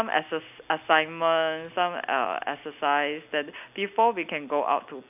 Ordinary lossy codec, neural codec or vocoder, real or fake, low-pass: none; none; real; 3.6 kHz